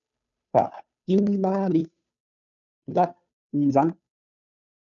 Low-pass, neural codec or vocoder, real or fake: 7.2 kHz; codec, 16 kHz, 2 kbps, FunCodec, trained on Chinese and English, 25 frames a second; fake